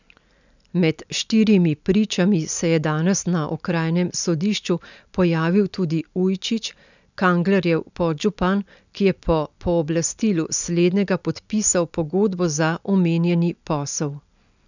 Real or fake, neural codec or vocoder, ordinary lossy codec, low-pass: real; none; none; 7.2 kHz